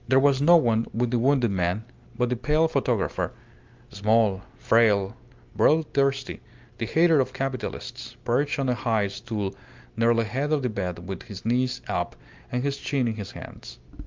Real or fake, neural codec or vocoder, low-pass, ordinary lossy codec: real; none; 7.2 kHz; Opus, 24 kbps